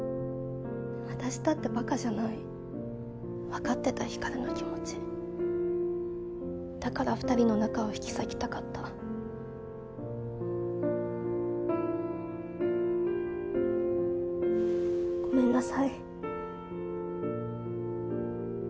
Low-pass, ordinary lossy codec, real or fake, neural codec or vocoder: none; none; real; none